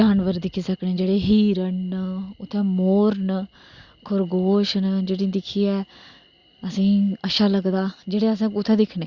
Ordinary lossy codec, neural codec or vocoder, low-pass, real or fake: Opus, 64 kbps; none; 7.2 kHz; real